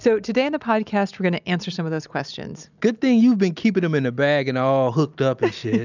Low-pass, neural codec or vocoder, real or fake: 7.2 kHz; none; real